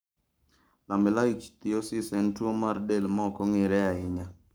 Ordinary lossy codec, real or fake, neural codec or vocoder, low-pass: none; fake; codec, 44.1 kHz, 7.8 kbps, Pupu-Codec; none